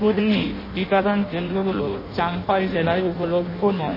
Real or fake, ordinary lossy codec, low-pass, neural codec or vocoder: fake; MP3, 24 kbps; 5.4 kHz; codec, 16 kHz in and 24 kHz out, 0.6 kbps, FireRedTTS-2 codec